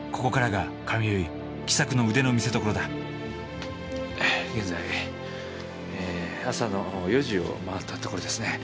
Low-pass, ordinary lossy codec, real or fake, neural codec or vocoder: none; none; real; none